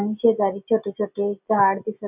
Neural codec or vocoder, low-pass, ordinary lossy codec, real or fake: none; 3.6 kHz; none; real